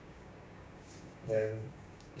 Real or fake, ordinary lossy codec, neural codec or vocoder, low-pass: fake; none; codec, 16 kHz, 6 kbps, DAC; none